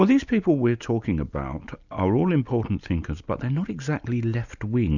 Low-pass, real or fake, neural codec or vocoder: 7.2 kHz; real; none